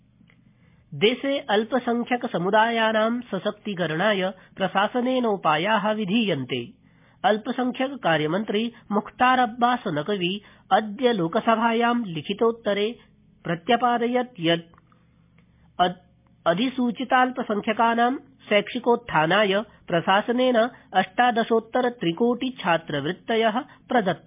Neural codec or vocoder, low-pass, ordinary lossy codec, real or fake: none; 3.6 kHz; MP3, 32 kbps; real